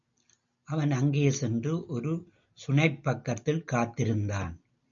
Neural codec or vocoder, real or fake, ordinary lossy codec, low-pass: none; real; MP3, 64 kbps; 7.2 kHz